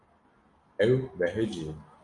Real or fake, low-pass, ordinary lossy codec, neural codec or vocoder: real; 10.8 kHz; AAC, 64 kbps; none